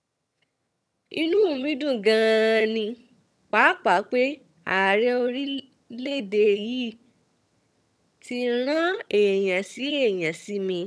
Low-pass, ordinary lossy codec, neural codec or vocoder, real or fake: none; none; vocoder, 22.05 kHz, 80 mel bands, HiFi-GAN; fake